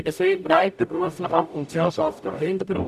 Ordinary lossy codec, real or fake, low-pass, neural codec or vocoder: none; fake; 14.4 kHz; codec, 44.1 kHz, 0.9 kbps, DAC